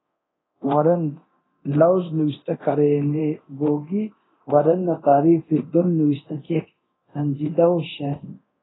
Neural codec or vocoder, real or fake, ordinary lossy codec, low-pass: codec, 24 kHz, 0.9 kbps, DualCodec; fake; AAC, 16 kbps; 7.2 kHz